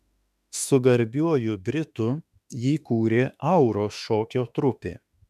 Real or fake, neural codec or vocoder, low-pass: fake; autoencoder, 48 kHz, 32 numbers a frame, DAC-VAE, trained on Japanese speech; 14.4 kHz